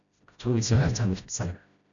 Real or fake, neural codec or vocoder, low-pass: fake; codec, 16 kHz, 0.5 kbps, FreqCodec, smaller model; 7.2 kHz